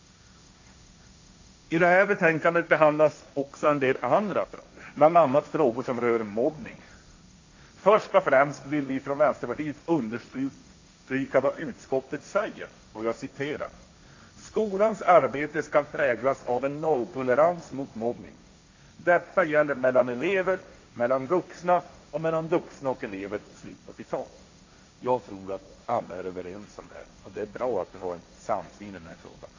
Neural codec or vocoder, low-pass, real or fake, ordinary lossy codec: codec, 16 kHz, 1.1 kbps, Voila-Tokenizer; 7.2 kHz; fake; AAC, 48 kbps